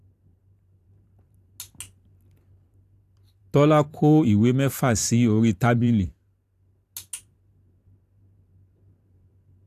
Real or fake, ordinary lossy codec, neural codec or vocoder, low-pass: fake; AAC, 64 kbps; vocoder, 44.1 kHz, 128 mel bands every 512 samples, BigVGAN v2; 14.4 kHz